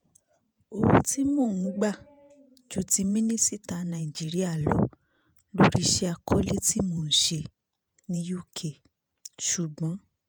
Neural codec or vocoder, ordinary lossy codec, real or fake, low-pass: vocoder, 48 kHz, 128 mel bands, Vocos; none; fake; none